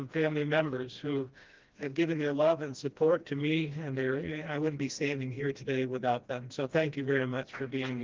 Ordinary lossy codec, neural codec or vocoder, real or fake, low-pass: Opus, 32 kbps; codec, 16 kHz, 1 kbps, FreqCodec, smaller model; fake; 7.2 kHz